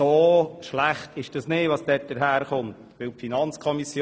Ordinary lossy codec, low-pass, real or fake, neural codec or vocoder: none; none; real; none